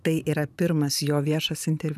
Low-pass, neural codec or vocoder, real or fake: 14.4 kHz; vocoder, 44.1 kHz, 128 mel bands every 512 samples, BigVGAN v2; fake